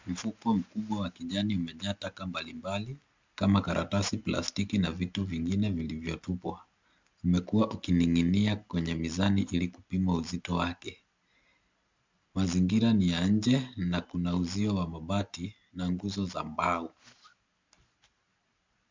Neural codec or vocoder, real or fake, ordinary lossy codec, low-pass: none; real; MP3, 64 kbps; 7.2 kHz